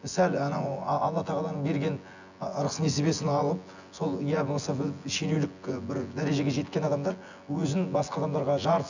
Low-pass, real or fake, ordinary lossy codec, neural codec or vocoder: 7.2 kHz; fake; none; vocoder, 24 kHz, 100 mel bands, Vocos